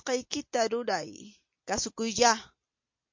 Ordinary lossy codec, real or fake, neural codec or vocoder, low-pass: MP3, 48 kbps; real; none; 7.2 kHz